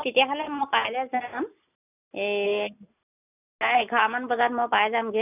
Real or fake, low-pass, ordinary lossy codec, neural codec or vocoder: real; 3.6 kHz; none; none